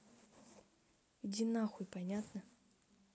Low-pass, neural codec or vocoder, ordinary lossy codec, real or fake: none; none; none; real